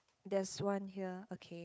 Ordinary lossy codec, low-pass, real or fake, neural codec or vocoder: none; none; fake; codec, 16 kHz, 8 kbps, FunCodec, trained on Chinese and English, 25 frames a second